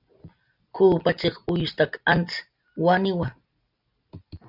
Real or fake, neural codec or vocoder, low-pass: real; none; 5.4 kHz